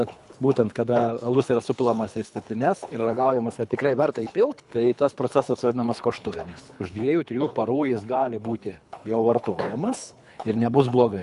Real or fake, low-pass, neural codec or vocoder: fake; 10.8 kHz; codec, 24 kHz, 3 kbps, HILCodec